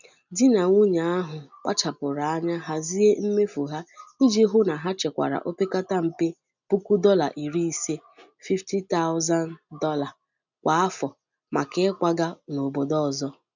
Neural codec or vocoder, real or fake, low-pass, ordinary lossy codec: none; real; 7.2 kHz; none